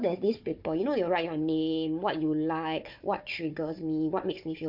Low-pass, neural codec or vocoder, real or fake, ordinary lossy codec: 5.4 kHz; codec, 16 kHz, 4 kbps, FunCodec, trained on Chinese and English, 50 frames a second; fake; MP3, 48 kbps